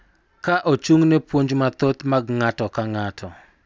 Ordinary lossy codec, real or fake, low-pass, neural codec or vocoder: none; real; none; none